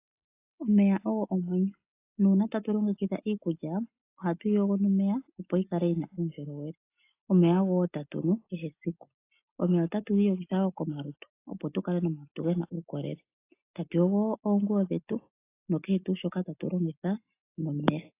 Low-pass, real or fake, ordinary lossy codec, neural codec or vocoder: 3.6 kHz; real; AAC, 24 kbps; none